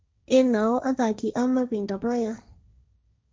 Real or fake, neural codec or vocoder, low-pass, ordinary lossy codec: fake; codec, 16 kHz, 1.1 kbps, Voila-Tokenizer; none; none